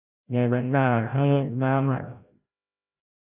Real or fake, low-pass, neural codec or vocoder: fake; 3.6 kHz; codec, 16 kHz, 0.5 kbps, FreqCodec, larger model